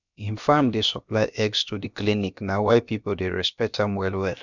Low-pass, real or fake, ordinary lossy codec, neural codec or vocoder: 7.2 kHz; fake; none; codec, 16 kHz, about 1 kbps, DyCAST, with the encoder's durations